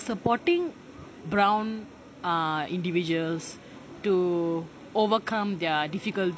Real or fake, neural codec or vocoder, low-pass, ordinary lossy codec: real; none; none; none